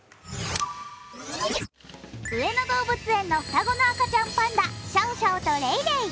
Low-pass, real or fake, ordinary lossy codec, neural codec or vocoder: none; real; none; none